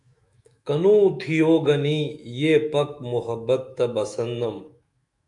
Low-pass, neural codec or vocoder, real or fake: 10.8 kHz; autoencoder, 48 kHz, 128 numbers a frame, DAC-VAE, trained on Japanese speech; fake